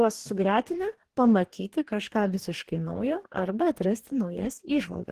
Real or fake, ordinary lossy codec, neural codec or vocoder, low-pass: fake; Opus, 16 kbps; codec, 44.1 kHz, 2.6 kbps, DAC; 14.4 kHz